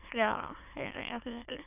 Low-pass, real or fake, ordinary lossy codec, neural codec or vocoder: 3.6 kHz; fake; none; autoencoder, 22.05 kHz, a latent of 192 numbers a frame, VITS, trained on many speakers